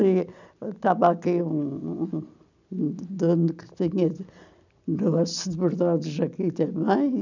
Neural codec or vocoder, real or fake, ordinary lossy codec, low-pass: none; real; none; 7.2 kHz